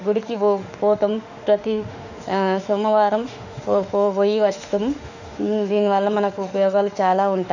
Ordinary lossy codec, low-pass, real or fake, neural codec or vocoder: none; 7.2 kHz; fake; autoencoder, 48 kHz, 32 numbers a frame, DAC-VAE, trained on Japanese speech